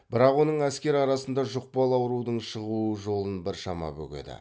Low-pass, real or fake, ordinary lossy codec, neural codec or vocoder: none; real; none; none